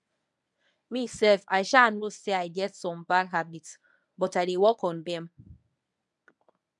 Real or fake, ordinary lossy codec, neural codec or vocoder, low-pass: fake; none; codec, 24 kHz, 0.9 kbps, WavTokenizer, medium speech release version 1; 10.8 kHz